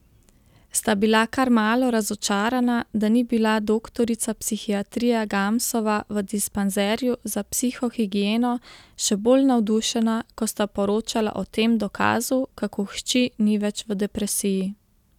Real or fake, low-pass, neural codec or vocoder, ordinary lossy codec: real; 19.8 kHz; none; none